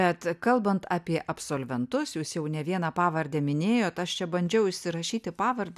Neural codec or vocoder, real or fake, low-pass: none; real; 14.4 kHz